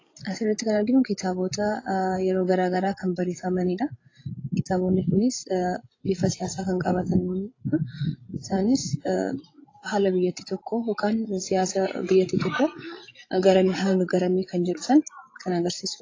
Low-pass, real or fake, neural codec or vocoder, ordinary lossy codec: 7.2 kHz; fake; codec, 16 kHz, 16 kbps, FreqCodec, larger model; AAC, 32 kbps